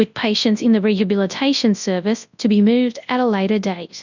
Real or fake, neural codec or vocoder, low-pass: fake; codec, 24 kHz, 0.9 kbps, WavTokenizer, large speech release; 7.2 kHz